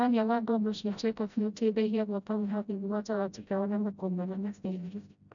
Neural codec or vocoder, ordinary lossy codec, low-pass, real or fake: codec, 16 kHz, 0.5 kbps, FreqCodec, smaller model; none; 7.2 kHz; fake